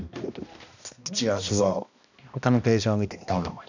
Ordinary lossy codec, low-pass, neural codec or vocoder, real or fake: none; 7.2 kHz; codec, 16 kHz, 1 kbps, X-Codec, HuBERT features, trained on balanced general audio; fake